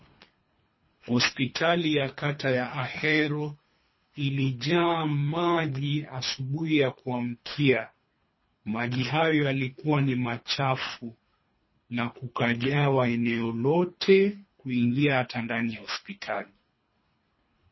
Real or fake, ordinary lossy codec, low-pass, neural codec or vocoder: fake; MP3, 24 kbps; 7.2 kHz; codec, 24 kHz, 3 kbps, HILCodec